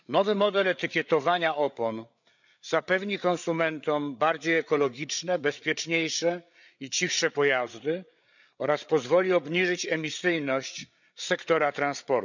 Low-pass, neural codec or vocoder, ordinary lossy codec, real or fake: 7.2 kHz; codec, 16 kHz, 8 kbps, FreqCodec, larger model; none; fake